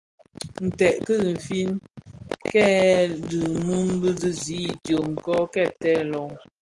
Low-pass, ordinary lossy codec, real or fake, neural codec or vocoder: 10.8 kHz; Opus, 24 kbps; real; none